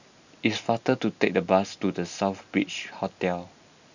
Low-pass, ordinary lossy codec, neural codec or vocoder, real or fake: 7.2 kHz; none; none; real